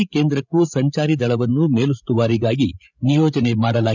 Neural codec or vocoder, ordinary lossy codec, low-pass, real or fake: codec, 16 kHz, 16 kbps, FreqCodec, larger model; none; 7.2 kHz; fake